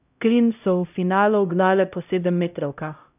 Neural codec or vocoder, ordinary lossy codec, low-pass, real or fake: codec, 16 kHz, 0.5 kbps, X-Codec, HuBERT features, trained on LibriSpeech; none; 3.6 kHz; fake